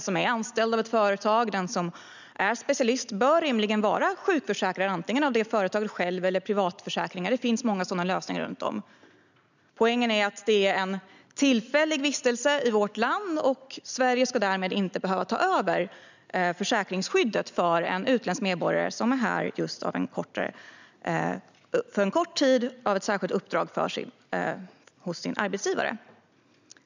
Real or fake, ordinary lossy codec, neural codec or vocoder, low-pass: real; none; none; 7.2 kHz